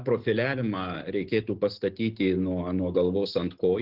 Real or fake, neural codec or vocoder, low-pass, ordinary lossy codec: real; none; 5.4 kHz; Opus, 16 kbps